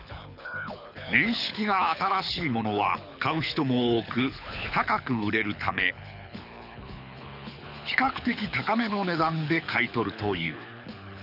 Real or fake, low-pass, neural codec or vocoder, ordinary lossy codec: fake; 5.4 kHz; codec, 24 kHz, 6 kbps, HILCodec; none